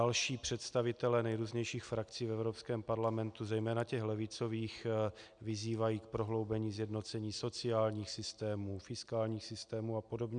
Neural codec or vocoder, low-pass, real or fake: none; 9.9 kHz; real